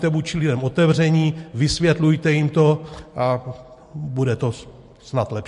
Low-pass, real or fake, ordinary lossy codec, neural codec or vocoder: 14.4 kHz; real; MP3, 48 kbps; none